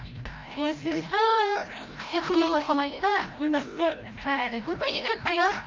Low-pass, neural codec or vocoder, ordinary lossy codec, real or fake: 7.2 kHz; codec, 16 kHz, 0.5 kbps, FreqCodec, larger model; Opus, 24 kbps; fake